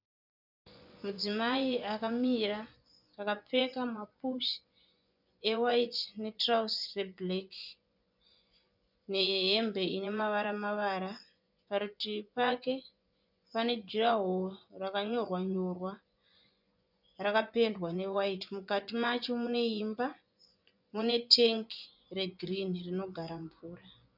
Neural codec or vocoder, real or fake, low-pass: vocoder, 24 kHz, 100 mel bands, Vocos; fake; 5.4 kHz